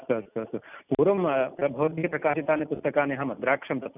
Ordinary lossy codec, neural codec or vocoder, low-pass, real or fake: none; none; 3.6 kHz; real